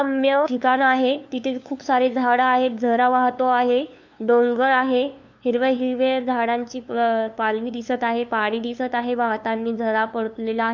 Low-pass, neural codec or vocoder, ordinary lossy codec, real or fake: 7.2 kHz; codec, 16 kHz, 2 kbps, FunCodec, trained on LibriTTS, 25 frames a second; none; fake